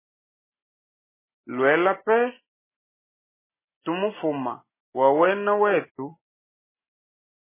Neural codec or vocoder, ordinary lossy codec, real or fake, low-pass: none; MP3, 16 kbps; real; 3.6 kHz